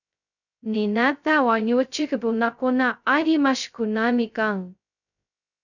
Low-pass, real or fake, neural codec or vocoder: 7.2 kHz; fake; codec, 16 kHz, 0.2 kbps, FocalCodec